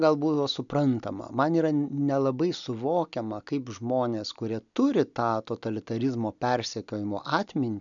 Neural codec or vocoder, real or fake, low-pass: none; real; 7.2 kHz